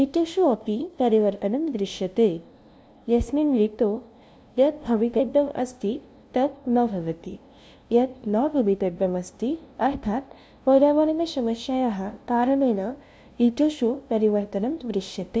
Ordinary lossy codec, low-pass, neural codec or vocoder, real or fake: none; none; codec, 16 kHz, 0.5 kbps, FunCodec, trained on LibriTTS, 25 frames a second; fake